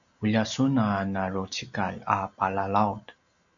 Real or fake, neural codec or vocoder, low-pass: real; none; 7.2 kHz